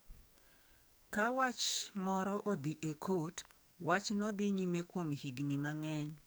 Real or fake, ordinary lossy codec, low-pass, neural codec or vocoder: fake; none; none; codec, 44.1 kHz, 2.6 kbps, SNAC